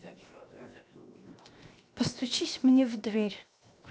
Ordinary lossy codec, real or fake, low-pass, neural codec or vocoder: none; fake; none; codec, 16 kHz, 0.7 kbps, FocalCodec